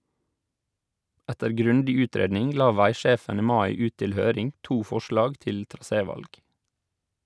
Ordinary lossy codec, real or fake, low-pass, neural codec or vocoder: none; real; none; none